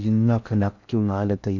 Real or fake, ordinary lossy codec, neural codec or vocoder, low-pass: fake; none; codec, 16 kHz in and 24 kHz out, 0.6 kbps, FocalCodec, streaming, 4096 codes; 7.2 kHz